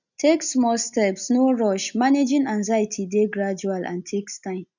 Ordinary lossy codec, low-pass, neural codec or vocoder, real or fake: none; 7.2 kHz; none; real